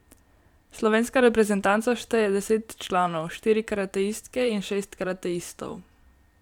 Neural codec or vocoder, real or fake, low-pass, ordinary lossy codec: vocoder, 44.1 kHz, 128 mel bands every 512 samples, BigVGAN v2; fake; 19.8 kHz; none